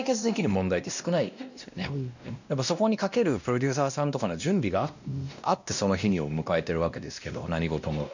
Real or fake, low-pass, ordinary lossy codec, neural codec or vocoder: fake; 7.2 kHz; none; codec, 16 kHz, 1 kbps, X-Codec, WavLM features, trained on Multilingual LibriSpeech